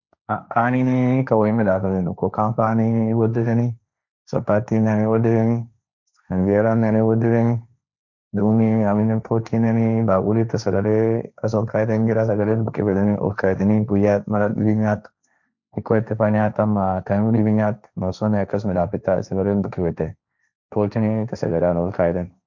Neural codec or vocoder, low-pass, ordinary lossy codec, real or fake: codec, 16 kHz, 1.1 kbps, Voila-Tokenizer; 7.2 kHz; none; fake